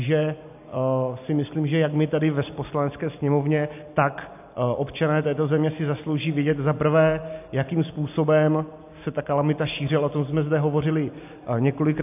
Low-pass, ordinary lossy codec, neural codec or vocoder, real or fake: 3.6 kHz; AAC, 32 kbps; none; real